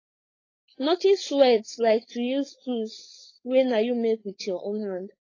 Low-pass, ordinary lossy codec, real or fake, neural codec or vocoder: 7.2 kHz; AAC, 32 kbps; fake; codec, 16 kHz, 4.8 kbps, FACodec